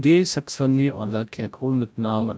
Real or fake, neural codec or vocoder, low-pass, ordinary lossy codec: fake; codec, 16 kHz, 0.5 kbps, FreqCodec, larger model; none; none